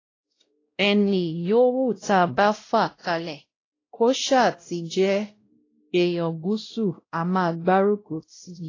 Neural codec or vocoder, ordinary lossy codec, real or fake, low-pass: codec, 16 kHz, 0.5 kbps, X-Codec, WavLM features, trained on Multilingual LibriSpeech; AAC, 32 kbps; fake; 7.2 kHz